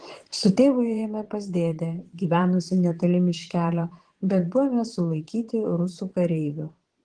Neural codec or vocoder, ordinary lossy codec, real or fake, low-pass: vocoder, 22.05 kHz, 80 mel bands, Vocos; Opus, 16 kbps; fake; 9.9 kHz